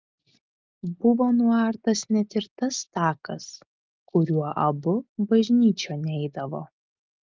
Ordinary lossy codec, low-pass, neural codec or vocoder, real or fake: Opus, 32 kbps; 7.2 kHz; none; real